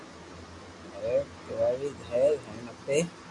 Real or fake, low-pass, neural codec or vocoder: real; 10.8 kHz; none